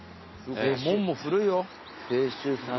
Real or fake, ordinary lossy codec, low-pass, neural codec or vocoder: real; MP3, 24 kbps; 7.2 kHz; none